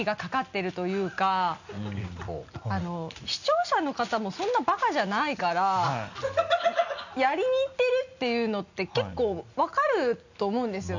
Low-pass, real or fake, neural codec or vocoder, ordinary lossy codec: 7.2 kHz; real; none; none